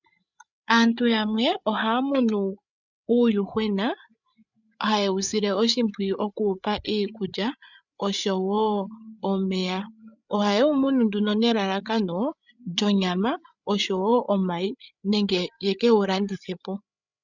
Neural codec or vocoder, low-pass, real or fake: codec, 16 kHz, 8 kbps, FreqCodec, larger model; 7.2 kHz; fake